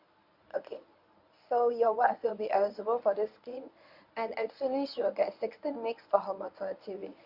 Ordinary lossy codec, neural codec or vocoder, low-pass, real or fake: Opus, 64 kbps; codec, 24 kHz, 0.9 kbps, WavTokenizer, medium speech release version 1; 5.4 kHz; fake